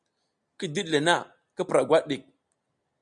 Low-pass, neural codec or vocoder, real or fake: 9.9 kHz; none; real